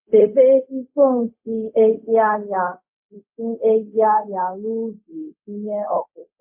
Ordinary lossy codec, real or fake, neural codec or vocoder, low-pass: MP3, 32 kbps; fake; codec, 16 kHz, 0.4 kbps, LongCat-Audio-Codec; 3.6 kHz